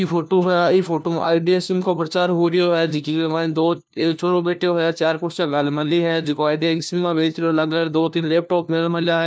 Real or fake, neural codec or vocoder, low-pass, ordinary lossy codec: fake; codec, 16 kHz, 1 kbps, FunCodec, trained on LibriTTS, 50 frames a second; none; none